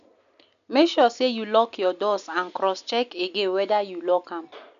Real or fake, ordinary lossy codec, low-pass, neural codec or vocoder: real; none; 7.2 kHz; none